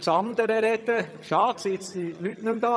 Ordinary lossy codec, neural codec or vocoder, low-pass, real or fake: none; vocoder, 22.05 kHz, 80 mel bands, HiFi-GAN; none; fake